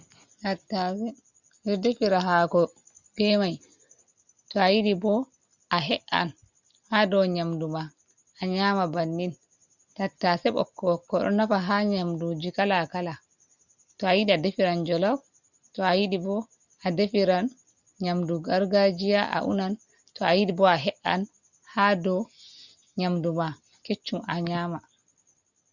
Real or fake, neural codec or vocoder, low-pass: real; none; 7.2 kHz